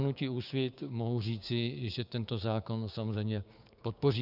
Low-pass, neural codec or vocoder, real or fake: 5.4 kHz; codec, 16 kHz, 6 kbps, DAC; fake